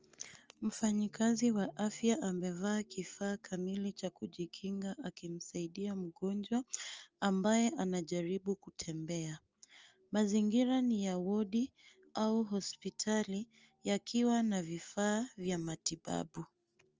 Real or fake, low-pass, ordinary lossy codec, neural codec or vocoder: real; 7.2 kHz; Opus, 24 kbps; none